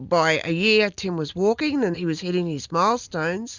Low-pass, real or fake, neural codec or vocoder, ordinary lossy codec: 7.2 kHz; real; none; Opus, 64 kbps